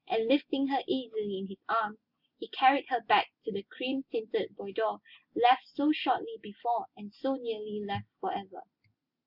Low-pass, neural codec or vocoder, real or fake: 5.4 kHz; none; real